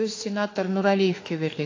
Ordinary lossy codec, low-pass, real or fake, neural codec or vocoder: AAC, 32 kbps; 7.2 kHz; fake; autoencoder, 48 kHz, 32 numbers a frame, DAC-VAE, trained on Japanese speech